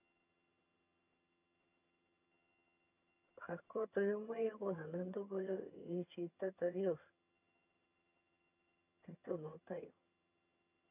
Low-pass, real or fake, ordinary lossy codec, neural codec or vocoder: 3.6 kHz; fake; none; vocoder, 22.05 kHz, 80 mel bands, HiFi-GAN